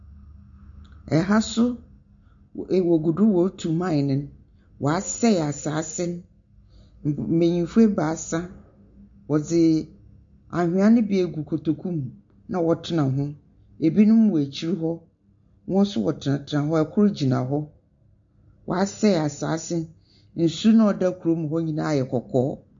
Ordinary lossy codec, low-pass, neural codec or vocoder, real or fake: MP3, 48 kbps; 7.2 kHz; none; real